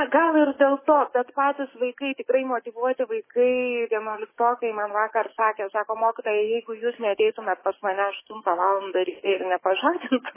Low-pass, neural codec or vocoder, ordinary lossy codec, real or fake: 3.6 kHz; codec, 44.1 kHz, 7.8 kbps, DAC; MP3, 16 kbps; fake